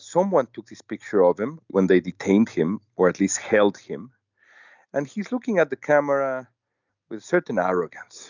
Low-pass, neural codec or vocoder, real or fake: 7.2 kHz; none; real